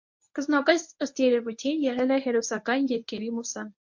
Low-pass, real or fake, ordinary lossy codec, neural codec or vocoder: 7.2 kHz; fake; MP3, 48 kbps; codec, 24 kHz, 0.9 kbps, WavTokenizer, medium speech release version 1